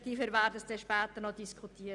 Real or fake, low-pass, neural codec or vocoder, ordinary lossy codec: real; 10.8 kHz; none; none